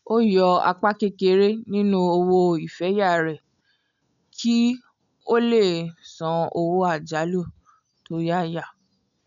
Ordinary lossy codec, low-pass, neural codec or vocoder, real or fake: none; 7.2 kHz; none; real